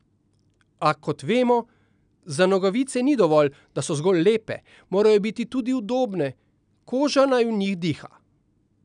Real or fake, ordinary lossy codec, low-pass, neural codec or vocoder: real; none; 9.9 kHz; none